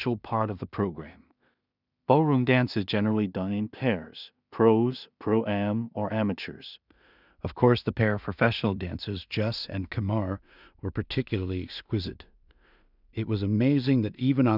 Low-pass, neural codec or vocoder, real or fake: 5.4 kHz; codec, 16 kHz in and 24 kHz out, 0.4 kbps, LongCat-Audio-Codec, two codebook decoder; fake